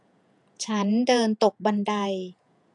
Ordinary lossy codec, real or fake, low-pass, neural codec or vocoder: none; fake; 10.8 kHz; vocoder, 48 kHz, 128 mel bands, Vocos